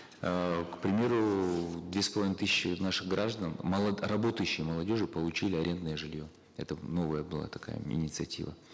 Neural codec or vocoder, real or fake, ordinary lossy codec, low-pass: none; real; none; none